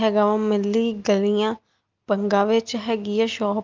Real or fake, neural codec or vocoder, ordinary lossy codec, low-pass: real; none; Opus, 24 kbps; 7.2 kHz